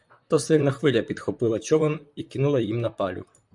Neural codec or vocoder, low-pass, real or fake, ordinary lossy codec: vocoder, 44.1 kHz, 128 mel bands, Pupu-Vocoder; 10.8 kHz; fake; MP3, 96 kbps